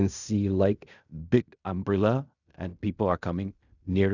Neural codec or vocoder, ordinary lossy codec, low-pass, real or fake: codec, 16 kHz in and 24 kHz out, 0.4 kbps, LongCat-Audio-Codec, fine tuned four codebook decoder; none; 7.2 kHz; fake